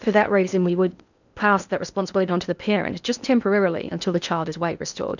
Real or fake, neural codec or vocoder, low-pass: fake; codec, 16 kHz in and 24 kHz out, 0.8 kbps, FocalCodec, streaming, 65536 codes; 7.2 kHz